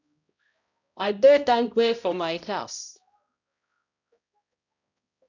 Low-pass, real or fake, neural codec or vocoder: 7.2 kHz; fake; codec, 16 kHz, 0.5 kbps, X-Codec, HuBERT features, trained on balanced general audio